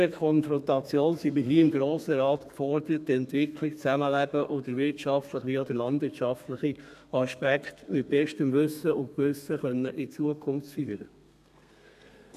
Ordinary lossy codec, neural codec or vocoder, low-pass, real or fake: none; codec, 32 kHz, 1.9 kbps, SNAC; 14.4 kHz; fake